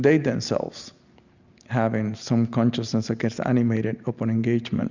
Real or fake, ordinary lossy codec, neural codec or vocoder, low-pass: real; Opus, 64 kbps; none; 7.2 kHz